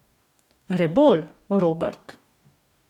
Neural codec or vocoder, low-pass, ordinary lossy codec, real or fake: codec, 44.1 kHz, 2.6 kbps, DAC; 19.8 kHz; none; fake